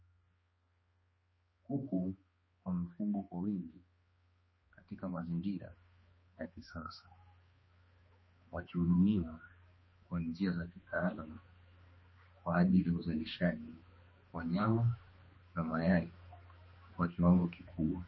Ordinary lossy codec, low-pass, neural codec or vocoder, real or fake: MP3, 24 kbps; 7.2 kHz; codec, 16 kHz, 2 kbps, X-Codec, HuBERT features, trained on balanced general audio; fake